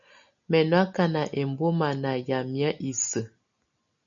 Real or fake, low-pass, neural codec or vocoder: real; 7.2 kHz; none